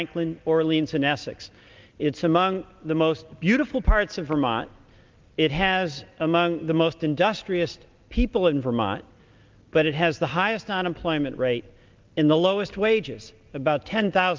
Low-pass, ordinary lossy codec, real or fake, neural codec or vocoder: 7.2 kHz; Opus, 32 kbps; real; none